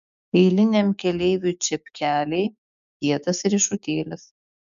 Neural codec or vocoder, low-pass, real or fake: codec, 16 kHz, 6 kbps, DAC; 7.2 kHz; fake